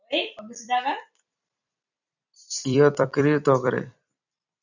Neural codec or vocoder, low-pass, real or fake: vocoder, 44.1 kHz, 128 mel bands every 256 samples, BigVGAN v2; 7.2 kHz; fake